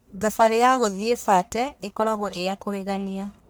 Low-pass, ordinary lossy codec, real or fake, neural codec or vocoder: none; none; fake; codec, 44.1 kHz, 1.7 kbps, Pupu-Codec